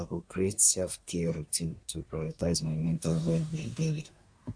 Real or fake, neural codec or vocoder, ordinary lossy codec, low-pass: fake; codec, 24 kHz, 1 kbps, SNAC; AAC, 64 kbps; 9.9 kHz